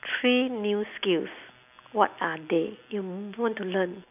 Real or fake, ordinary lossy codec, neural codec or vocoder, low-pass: real; none; none; 3.6 kHz